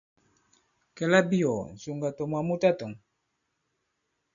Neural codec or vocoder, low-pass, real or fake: none; 7.2 kHz; real